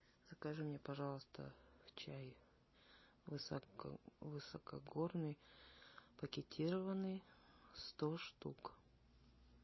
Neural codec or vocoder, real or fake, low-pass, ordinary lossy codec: none; real; 7.2 kHz; MP3, 24 kbps